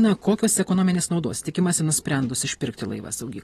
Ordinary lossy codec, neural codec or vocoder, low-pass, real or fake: AAC, 32 kbps; none; 19.8 kHz; real